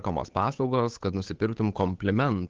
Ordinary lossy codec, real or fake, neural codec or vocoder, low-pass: Opus, 16 kbps; fake; codec, 16 kHz, 8 kbps, FunCodec, trained on Chinese and English, 25 frames a second; 7.2 kHz